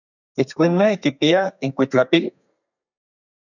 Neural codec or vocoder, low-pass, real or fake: codec, 32 kHz, 1.9 kbps, SNAC; 7.2 kHz; fake